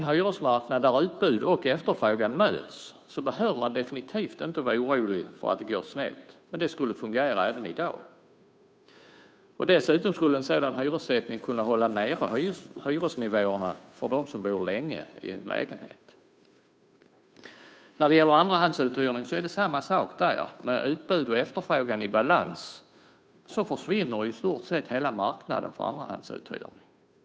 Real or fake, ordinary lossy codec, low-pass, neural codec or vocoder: fake; none; none; codec, 16 kHz, 2 kbps, FunCodec, trained on Chinese and English, 25 frames a second